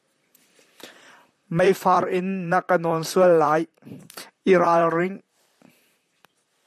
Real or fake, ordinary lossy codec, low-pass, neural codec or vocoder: fake; MP3, 96 kbps; 14.4 kHz; vocoder, 44.1 kHz, 128 mel bands, Pupu-Vocoder